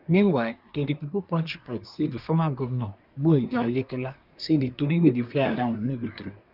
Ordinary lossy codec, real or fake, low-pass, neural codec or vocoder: none; fake; 5.4 kHz; codec, 24 kHz, 1 kbps, SNAC